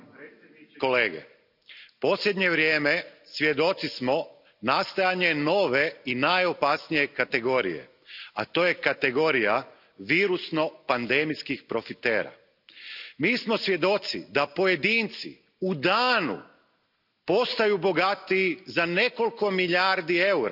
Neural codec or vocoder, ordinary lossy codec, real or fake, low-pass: none; none; real; 5.4 kHz